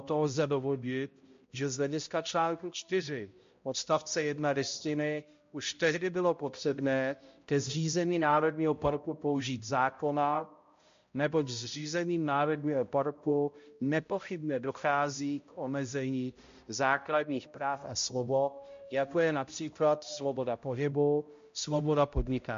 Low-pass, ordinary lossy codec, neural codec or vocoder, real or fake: 7.2 kHz; MP3, 48 kbps; codec, 16 kHz, 0.5 kbps, X-Codec, HuBERT features, trained on balanced general audio; fake